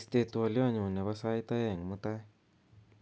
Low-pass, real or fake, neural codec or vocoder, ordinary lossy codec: none; real; none; none